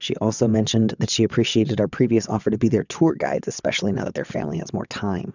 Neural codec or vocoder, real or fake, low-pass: vocoder, 22.05 kHz, 80 mel bands, WaveNeXt; fake; 7.2 kHz